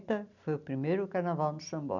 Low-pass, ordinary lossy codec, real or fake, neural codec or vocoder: 7.2 kHz; none; real; none